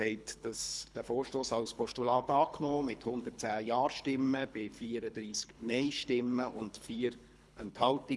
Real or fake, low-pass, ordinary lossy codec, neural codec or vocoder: fake; none; none; codec, 24 kHz, 3 kbps, HILCodec